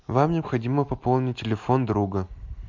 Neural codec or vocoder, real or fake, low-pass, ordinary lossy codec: none; real; 7.2 kHz; MP3, 64 kbps